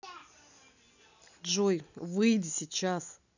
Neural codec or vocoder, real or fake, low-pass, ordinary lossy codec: none; real; 7.2 kHz; none